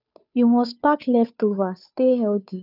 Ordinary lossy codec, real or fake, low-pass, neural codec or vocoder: none; fake; 5.4 kHz; codec, 16 kHz, 2 kbps, FunCodec, trained on Chinese and English, 25 frames a second